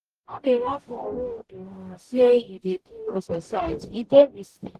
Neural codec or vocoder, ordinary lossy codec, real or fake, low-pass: codec, 44.1 kHz, 0.9 kbps, DAC; Opus, 16 kbps; fake; 14.4 kHz